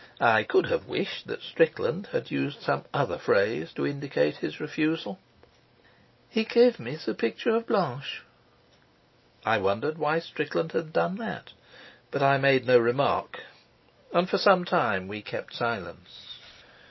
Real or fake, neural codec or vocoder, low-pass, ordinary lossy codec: real; none; 7.2 kHz; MP3, 24 kbps